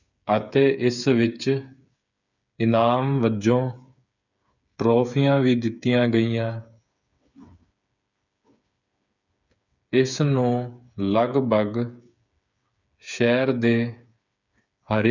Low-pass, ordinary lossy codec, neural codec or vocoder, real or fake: 7.2 kHz; none; codec, 16 kHz, 8 kbps, FreqCodec, smaller model; fake